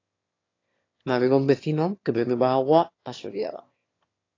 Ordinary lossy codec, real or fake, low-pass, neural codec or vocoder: AAC, 32 kbps; fake; 7.2 kHz; autoencoder, 22.05 kHz, a latent of 192 numbers a frame, VITS, trained on one speaker